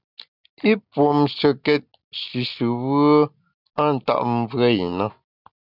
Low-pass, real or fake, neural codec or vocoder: 5.4 kHz; real; none